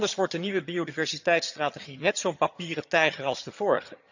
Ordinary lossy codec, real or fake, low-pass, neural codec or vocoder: none; fake; 7.2 kHz; vocoder, 22.05 kHz, 80 mel bands, HiFi-GAN